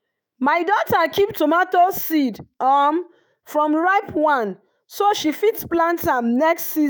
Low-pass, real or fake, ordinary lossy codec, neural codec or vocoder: none; fake; none; autoencoder, 48 kHz, 128 numbers a frame, DAC-VAE, trained on Japanese speech